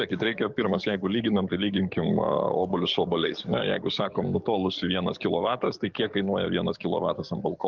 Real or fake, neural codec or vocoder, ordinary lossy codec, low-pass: fake; codec, 44.1 kHz, 7.8 kbps, DAC; Opus, 24 kbps; 7.2 kHz